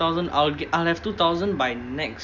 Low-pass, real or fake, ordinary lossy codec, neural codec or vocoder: 7.2 kHz; real; none; none